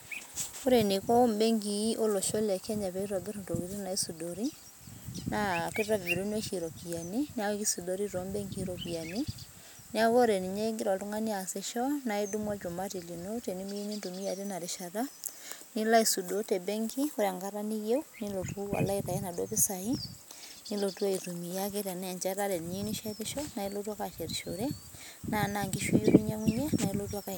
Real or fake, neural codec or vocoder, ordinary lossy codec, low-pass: real; none; none; none